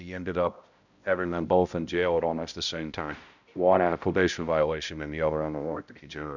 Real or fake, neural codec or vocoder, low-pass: fake; codec, 16 kHz, 0.5 kbps, X-Codec, HuBERT features, trained on balanced general audio; 7.2 kHz